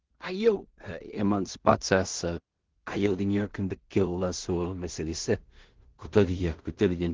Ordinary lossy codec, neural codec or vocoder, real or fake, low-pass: Opus, 16 kbps; codec, 16 kHz in and 24 kHz out, 0.4 kbps, LongCat-Audio-Codec, two codebook decoder; fake; 7.2 kHz